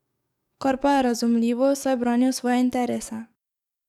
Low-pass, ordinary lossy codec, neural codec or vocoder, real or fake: 19.8 kHz; none; codec, 44.1 kHz, 7.8 kbps, DAC; fake